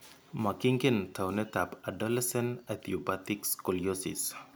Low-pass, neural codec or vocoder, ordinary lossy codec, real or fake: none; none; none; real